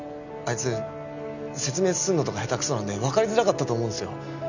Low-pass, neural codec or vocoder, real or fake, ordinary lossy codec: 7.2 kHz; none; real; none